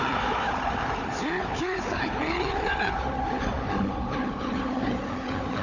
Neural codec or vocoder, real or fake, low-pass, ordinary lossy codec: codec, 16 kHz, 4 kbps, FunCodec, trained on Chinese and English, 50 frames a second; fake; 7.2 kHz; none